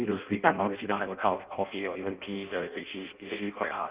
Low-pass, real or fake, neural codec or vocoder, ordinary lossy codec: 3.6 kHz; fake; codec, 16 kHz in and 24 kHz out, 0.6 kbps, FireRedTTS-2 codec; Opus, 32 kbps